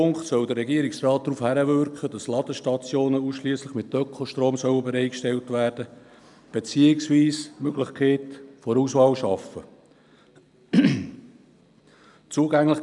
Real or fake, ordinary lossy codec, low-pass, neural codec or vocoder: real; none; 10.8 kHz; none